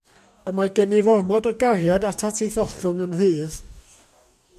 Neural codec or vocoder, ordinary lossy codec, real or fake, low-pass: codec, 44.1 kHz, 2.6 kbps, DAC; MP3, 96 kbps; fake; 14.4 kHz